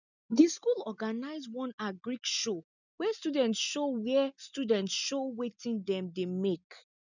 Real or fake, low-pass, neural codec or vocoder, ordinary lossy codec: real; 7.2 kHz; none; none